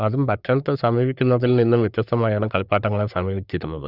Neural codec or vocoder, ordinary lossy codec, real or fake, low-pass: codec, 44.1 kHz, 3.4 kbps, Pupu-Codec; Opus, 64 kbps; fake; 5.4 kHz